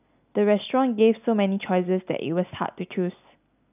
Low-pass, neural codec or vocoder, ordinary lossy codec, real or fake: 3.6 kHz; none; none; real